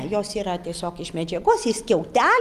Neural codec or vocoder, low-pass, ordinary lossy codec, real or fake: none; 14.4 kHz; Opus, 32 kbps; real